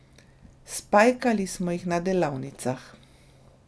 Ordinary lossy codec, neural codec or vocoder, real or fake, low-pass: none; none; real; none